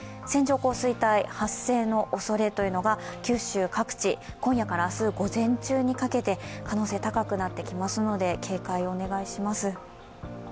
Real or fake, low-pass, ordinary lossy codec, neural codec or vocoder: real; none; none; none